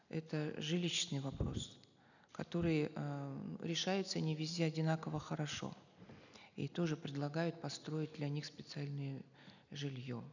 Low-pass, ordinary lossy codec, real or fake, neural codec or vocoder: 7.2 kHz; none; real; none